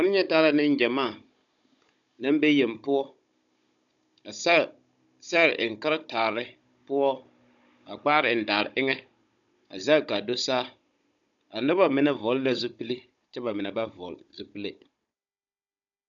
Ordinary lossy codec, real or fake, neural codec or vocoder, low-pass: MP3, 96 kbps; fake; codec, 16 kHz, 16 kbps, FunCodec, trained on Chinese and English, 50 frames a second; 7.2 kHz